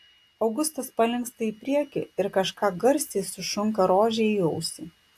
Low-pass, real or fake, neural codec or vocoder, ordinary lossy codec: 14.4 kHz; real; none; AAC, 64 kbps